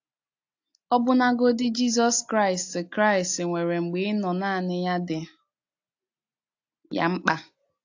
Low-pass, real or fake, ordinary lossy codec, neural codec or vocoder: 7.2 kHz; real; AAC, 48 kbps; none